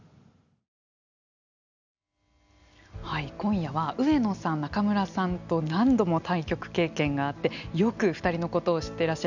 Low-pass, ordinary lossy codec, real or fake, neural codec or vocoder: 7.2 kHz; none; real; none